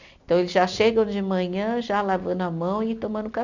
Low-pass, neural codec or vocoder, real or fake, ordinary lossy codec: 7.2 kHz; none; real; none